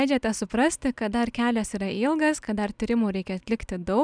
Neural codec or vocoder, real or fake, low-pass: none; real; 9.9 kHz